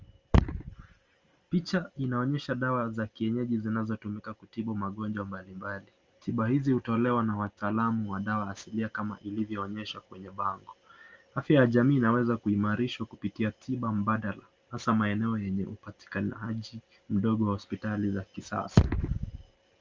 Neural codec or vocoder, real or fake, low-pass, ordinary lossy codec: none; real; 7.2 kHz; Opus, 32 kbps